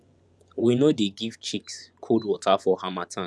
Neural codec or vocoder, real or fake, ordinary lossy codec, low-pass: vocoder, 24 kHz, 100 mel bands, Vocos; fake; none; none